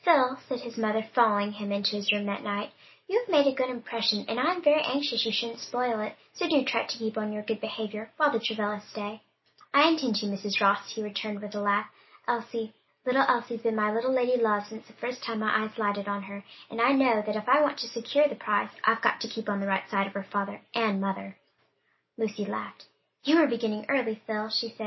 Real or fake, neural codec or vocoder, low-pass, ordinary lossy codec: real; none; 7.2 kHz; MP3, 24 kbps